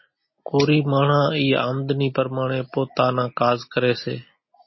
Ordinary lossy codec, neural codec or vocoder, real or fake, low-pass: MP3, 24 kbps; none; real; 7.2 kHz